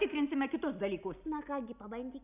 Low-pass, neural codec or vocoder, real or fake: 3.6 kHz; none; real